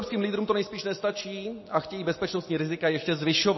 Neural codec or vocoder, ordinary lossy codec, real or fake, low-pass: none; MP3, 24 kbps; real; 7.2 kHz